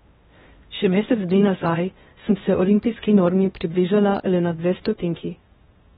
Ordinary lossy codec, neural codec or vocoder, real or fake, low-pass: AAC, 16 kbps; codec, 16 kHz in and 24 kHz out, 0.6 kbps, FocalCodec, streaming, 2048 codes; fake; 10.8 kHz